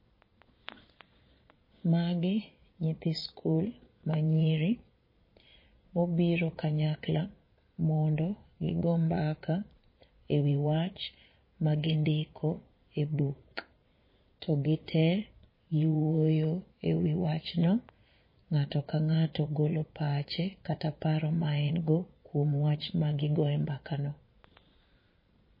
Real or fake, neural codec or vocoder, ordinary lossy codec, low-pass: fake; vocoder, 22.05 kHz, 80 mel bands, Vocos; MP3, 24 kbps; 5.4 kHz